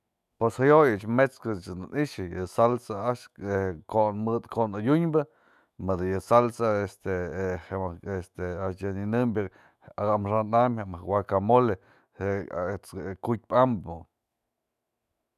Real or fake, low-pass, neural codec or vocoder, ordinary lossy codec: fake; 14.4 kHz; autoencoder, 48 kHz, 128 numbers a frame, DAC-VAE, trained on Japanese speech; none